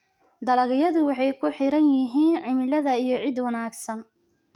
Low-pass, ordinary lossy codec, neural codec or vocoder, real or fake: 19.8 kHz; none; codec, 44.1 kHz, 7.8 kbps, DAC; fake